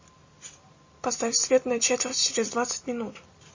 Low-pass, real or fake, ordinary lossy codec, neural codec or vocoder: 7.2 kHz; real; MP3, 32 kbps; none